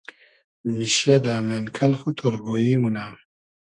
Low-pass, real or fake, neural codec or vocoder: 10.8 kHz; fake; codec, 32 kHz, 1.9 kbps, SNAC